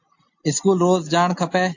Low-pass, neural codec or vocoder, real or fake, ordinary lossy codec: 7.2 kHz; none; real; AAC, 48 kbps